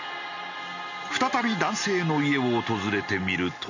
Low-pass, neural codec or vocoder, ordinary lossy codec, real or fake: 7.2 kHz; none; none; real